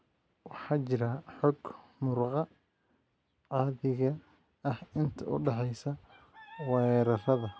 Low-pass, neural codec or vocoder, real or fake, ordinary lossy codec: none; none; real; none